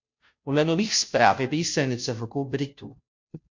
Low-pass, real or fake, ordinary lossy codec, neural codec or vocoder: 7.2 kHz; fake; MP3, 48 kbps; codec, 16 kHz, 0.5 kbps, FunCodec, trained on Chinese and English, 25 frames a second